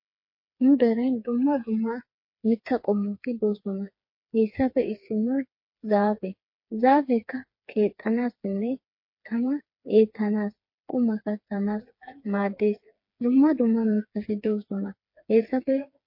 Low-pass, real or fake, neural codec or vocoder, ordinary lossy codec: 5.4 kHz; fake; codec, 16 kHz, 4 kbps, FreqCodec, smaller model; MP3, 32 kbps